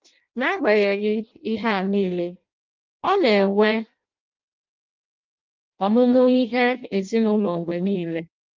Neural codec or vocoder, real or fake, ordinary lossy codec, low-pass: codec, 16 kHz in and 24 kHz out, 0.6 kbps, FireRedTTS-2 codec; fake; Opus, 24 kbps; 7.2 kHz